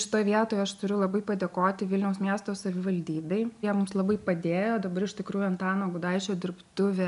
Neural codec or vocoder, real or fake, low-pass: none; real; 10.8 kHz